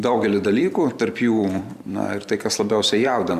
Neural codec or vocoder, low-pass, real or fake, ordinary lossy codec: vocoder, 44.1 kHz, 128 mel bands every 512 samples, BigVGAN v2; 14.4 kHz; fake; Opus, 64 kbps